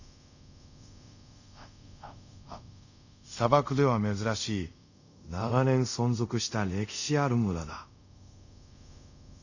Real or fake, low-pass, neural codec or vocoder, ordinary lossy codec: fake; 7.2 kHz; codec, 24 kHz, 0.5 kbps, DualCodec; none